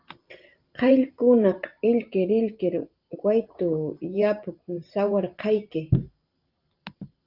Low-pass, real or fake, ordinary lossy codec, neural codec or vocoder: 5.4 kHz; fake; Opus, 24 kbps; vocoder, 44.1 kHz, 128 mel bands every 512 samples, BigVGAN v2